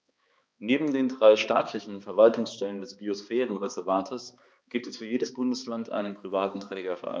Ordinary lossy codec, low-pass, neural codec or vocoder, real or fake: none; none; codec, 16 kHz, 2 kbps, X-Codec, HuBERT features, trained on balanced general audio; fake